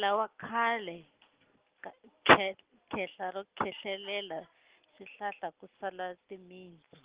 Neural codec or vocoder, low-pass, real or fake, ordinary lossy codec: none; 3.6 kHz; real; Opus, 16 kbps